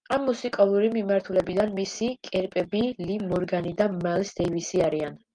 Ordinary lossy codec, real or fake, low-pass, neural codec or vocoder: Opus, 32 kbps; real; 9.9 kHz; none